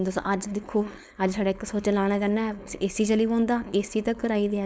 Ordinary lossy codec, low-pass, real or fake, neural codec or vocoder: none; none; fake; codec, 16 kHz, 4.8 kbps, FACodec